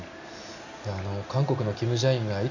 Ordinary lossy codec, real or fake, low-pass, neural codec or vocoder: none; real; 7.2 kHz; none